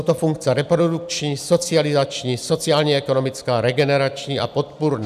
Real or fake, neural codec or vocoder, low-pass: real; none; 14.4 kHz